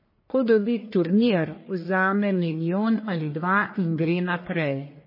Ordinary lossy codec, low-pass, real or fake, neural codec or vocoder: MP3, 24 kbps; 5.4 kHz; fake; codec, 44.1 kHz, 1.7 kbps, Pupu-Codec